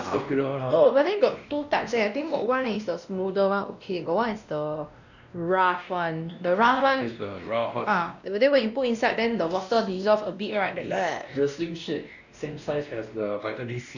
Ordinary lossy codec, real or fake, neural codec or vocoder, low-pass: none; fake; codec, 16 kHz, 1 kbps, X-Codec, WavLM features, trained on Multilingual LibriSpeech; 7.2 kHz